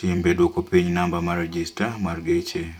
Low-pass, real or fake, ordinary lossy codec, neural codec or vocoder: 19.8 kHz; fake; none; vocoder, 44.1 kHz, 128 mel bands every 512 samples, BigVGAN v2